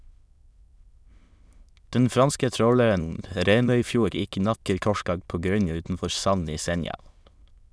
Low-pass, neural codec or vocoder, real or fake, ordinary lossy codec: none; autoencoder, 22.05 kHz, a latent of 192 numbers a frame, VITS, trained on many speakers; fake; none